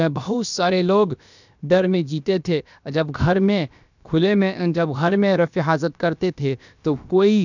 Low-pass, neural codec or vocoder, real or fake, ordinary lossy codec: 7.2 kHz; codec, 16 kHz, about 1 kbps, DyCAST, with the encoder's durations; fake; none